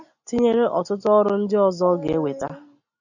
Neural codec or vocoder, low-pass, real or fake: none; 7.2 kHz; real